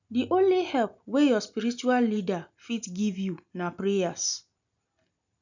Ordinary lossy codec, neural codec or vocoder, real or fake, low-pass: none; none; real; 7.2 kHz